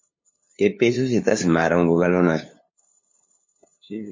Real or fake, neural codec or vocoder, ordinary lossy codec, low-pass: fake; codec, 16 kHz, 2 kbps, FunCodec, trained on LibriTTS, 25 frames a second; MP3, 32 kbps; 7.2 kHz